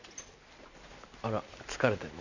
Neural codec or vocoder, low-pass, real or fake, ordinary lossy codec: none; 7.2 kHz; real; none